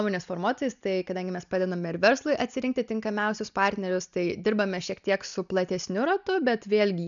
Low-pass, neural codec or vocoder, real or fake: 7.2 kHz; none; real